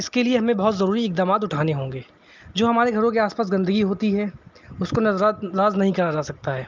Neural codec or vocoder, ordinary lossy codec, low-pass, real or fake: none; Opus, 32 kbps; 7.2 kHz; real